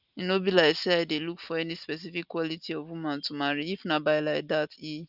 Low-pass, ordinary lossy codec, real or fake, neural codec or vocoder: 5.4 kHz; none; real; none